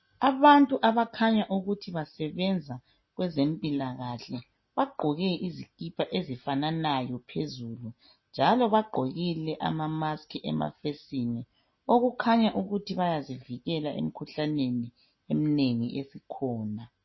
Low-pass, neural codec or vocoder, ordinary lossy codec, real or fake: 7.2 kHz; none; MP3, 24 kbps; real